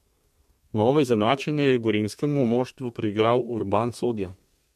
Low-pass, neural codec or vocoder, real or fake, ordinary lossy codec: 14.4 kHz; codec, 32 kHz, 1.9 kbps, SNAC; fake; MP3, 64 kbps